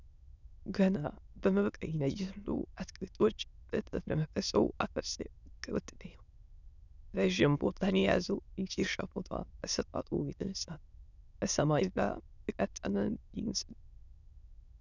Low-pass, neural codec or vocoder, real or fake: 7.2 kHz; autoencoder, 22.05 kHz, a latent of 192 numbers a frame, VITS, trained on many speakers; fake